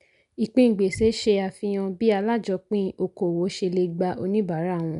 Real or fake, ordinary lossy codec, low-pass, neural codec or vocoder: real; none; 10.8 kHz; none